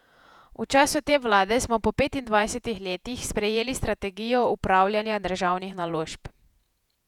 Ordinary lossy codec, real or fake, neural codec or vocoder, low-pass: none; fake; vocoder, 48 kHz, 128 mel bands, Vocos; 19.8 kHz